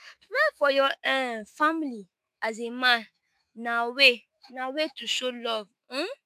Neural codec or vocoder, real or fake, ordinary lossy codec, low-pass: autoencoder, 48 kHz, 128 numbers a frame, DAC-VAE, trained on Japanese speech; fake; AAC, 96 kbps; 14.4 kHz